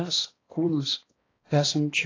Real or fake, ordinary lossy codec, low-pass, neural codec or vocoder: fake; AAC, 32 kbps; 7.2 kHz; codec, 16 kHz, 1 kbps, X-Codec, HuBERT features, trained on balanced general audio